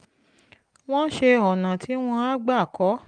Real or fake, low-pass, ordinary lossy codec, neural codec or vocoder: real; 9.9 kHz; Opus, 32 kbps; none